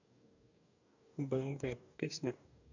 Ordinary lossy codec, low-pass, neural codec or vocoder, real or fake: none; 7.2 kHz; codec, 44.1 kHz, 2.6 kbps, DAC; fake